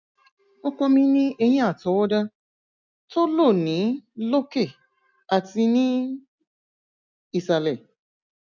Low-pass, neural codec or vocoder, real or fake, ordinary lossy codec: 7.2 kHz; none; real; none